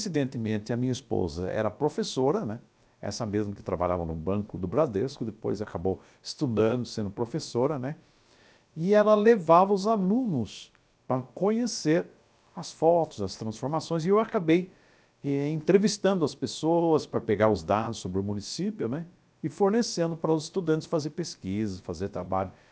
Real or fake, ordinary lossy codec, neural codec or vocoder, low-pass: fake; none; codec, 16 kHz, about 1 kbps, DyCAST, with the encoder's durations; none